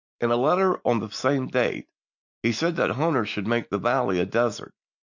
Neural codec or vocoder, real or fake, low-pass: none; real; 7.2 kHz